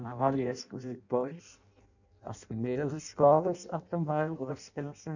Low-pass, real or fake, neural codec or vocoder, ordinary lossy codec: 7.2 kHz; fake; codec, 16 kHz in and 24 kHz out, 0.6 kbps, FireRedTTS-2 codec; none